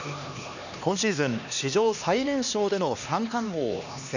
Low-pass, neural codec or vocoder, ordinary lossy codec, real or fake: 7.2 kHz; codec, 16 kHz, 2 kbps, X-Codec, HuBERT features, trained on LibriSpeech; none; fake